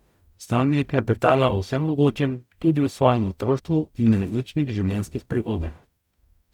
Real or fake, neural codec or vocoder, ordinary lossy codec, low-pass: fake; codec, 44.1 kHz, 0.9 kbps, DAC; none; 19.8 kHz